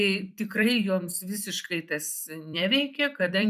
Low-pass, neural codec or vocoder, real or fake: 14.4 kHz; vocoder, 44.1 kHz, 128 mel bands every 256 samples, BigVGAN v2; fake